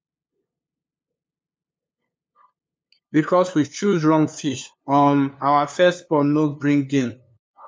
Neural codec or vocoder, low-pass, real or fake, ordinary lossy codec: codec, 16 kHz, 2 kbps, FunCodec, trained on LibriTTS, 25 frames a second; none; fake; none